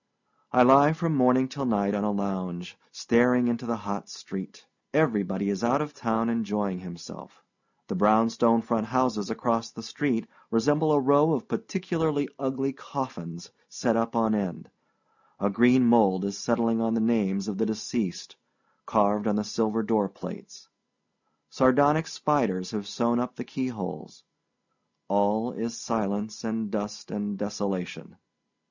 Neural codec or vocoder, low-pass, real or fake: none; 7.2 kHz; real